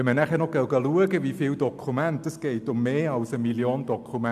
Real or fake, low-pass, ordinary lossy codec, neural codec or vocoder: real; 14.4 kHz; AAC, 96 kbps; none